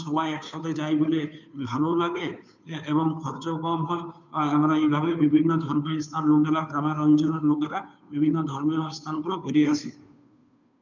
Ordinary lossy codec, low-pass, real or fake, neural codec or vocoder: none; 7.2 kHz; fake; codec, 16 kHz, 2 kbps, FunCodec, trained on Chinese and English, 25 frames a second